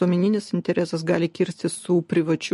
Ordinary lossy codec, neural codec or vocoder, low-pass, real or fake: MP3, 48 kbps; none; 14.4 kHz; real